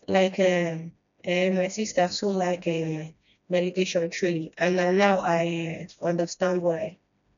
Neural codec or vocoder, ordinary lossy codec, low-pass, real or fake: codec, 16 kHz, 1 kbps, FreqCodec, smaller model; MP3, 96 kbps; 7.2 kHz; fake